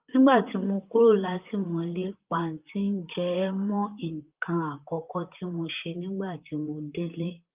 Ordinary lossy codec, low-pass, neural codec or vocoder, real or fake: Opus, 24 kbps; 3.6 kHz; vocoder, 44.1 kHz, 128 mel bands, Pupu-Vocoder; fake